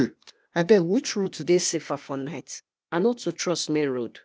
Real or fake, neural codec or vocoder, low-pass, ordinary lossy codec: fake; codec, 16 kHz, 0.8 kbps, ZipCodec; none; none